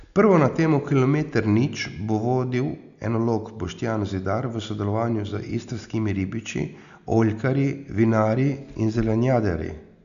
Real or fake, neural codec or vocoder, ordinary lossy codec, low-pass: real; none; none; 7.2 kHz